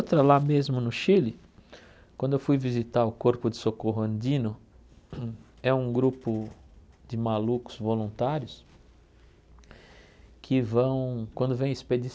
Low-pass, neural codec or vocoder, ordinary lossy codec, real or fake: none; none; none; real